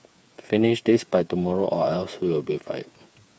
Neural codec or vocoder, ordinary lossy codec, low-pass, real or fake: codec, 16 kHz, 8 kbps, FreqCodec, smaller model; none; none; fake